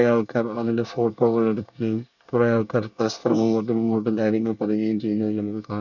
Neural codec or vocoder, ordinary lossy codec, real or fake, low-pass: codec, 24 kHz, 1 kbps, SNAC; none; fake; 7.2 kHz